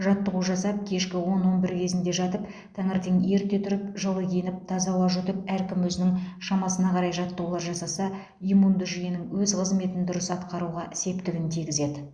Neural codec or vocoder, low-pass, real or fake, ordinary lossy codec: none; 9.9 kHz; real; Opus, 64 kbps